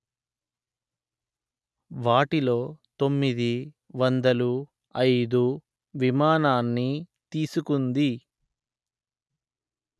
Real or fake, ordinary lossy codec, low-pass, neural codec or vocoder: real; none; none; none